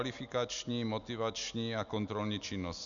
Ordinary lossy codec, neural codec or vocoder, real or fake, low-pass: MP3, 96 kbps; none; real; 7.2 kHz